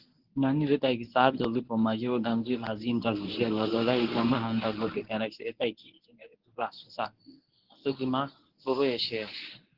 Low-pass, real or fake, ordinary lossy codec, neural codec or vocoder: 5.4 kHz; fake; Opus, 16 kbps; codec, 24 kHz, 0.9 kbps, WavTokenizer, medium speech release version 1